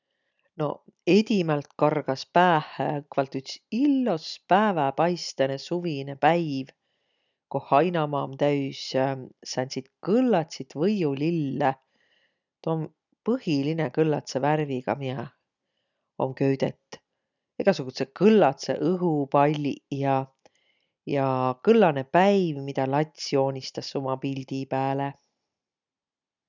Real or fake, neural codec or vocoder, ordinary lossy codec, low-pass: real; none; none; 7.2 kHz